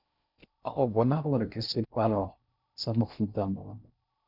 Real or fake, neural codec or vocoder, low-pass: fake; codec, 16 kHz in and 24 kHz out, 0.6 kbps, FocalCodec, streaming, 2048 codes; 5.4 kHz